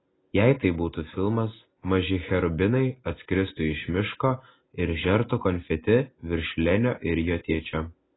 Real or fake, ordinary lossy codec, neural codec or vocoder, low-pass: real; AAC, 16 kbps; none; 7.2 kHz